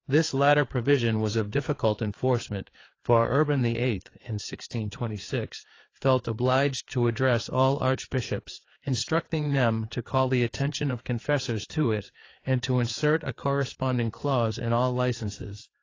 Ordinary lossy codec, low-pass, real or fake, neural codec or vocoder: AAC, 32 kbps; 7.2 kHz; fake; codec, 16 kHz, 4 kbps, FreqCodec, larger model